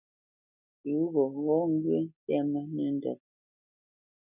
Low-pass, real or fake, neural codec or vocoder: 3.6 kHz; real; none